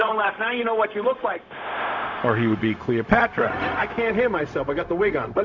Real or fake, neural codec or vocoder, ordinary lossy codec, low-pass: fake; codec, 16 kHz, 0.4 kbps, LongCat-Audio-Codec; Opus, 64 kbps; 7.2 kHz